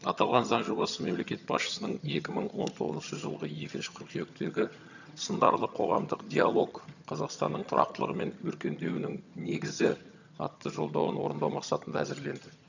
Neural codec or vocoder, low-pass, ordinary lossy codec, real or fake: vocoder, 22.05 kHz, 80 mel bands, HiFi-GAN; 7.2 kHz; none; fake